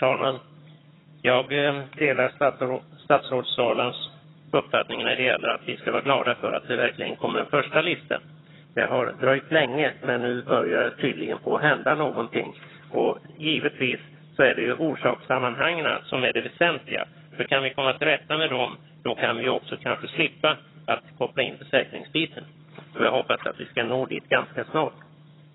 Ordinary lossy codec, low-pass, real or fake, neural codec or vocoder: AAC, 16 kbps; 7.2 kHz; fake; vocoder, 22.05 kHz, 80 mel bands, HiFi-GAN